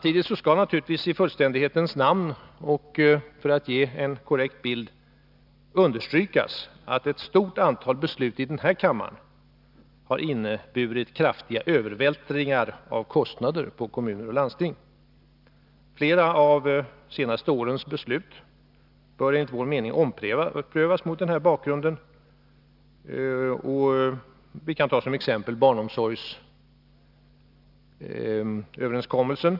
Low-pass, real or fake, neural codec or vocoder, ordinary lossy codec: 5.4 kHz; real; none; none